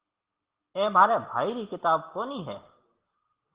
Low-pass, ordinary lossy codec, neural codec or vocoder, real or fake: 3.6 kHz; Opus, 16 kbps; none; real